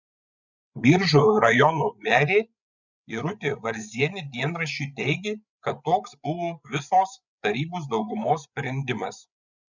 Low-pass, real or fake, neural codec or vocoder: 7.2 kHz; fake; codec, 16 kHz, 8 kbps, FreqCodec, larger model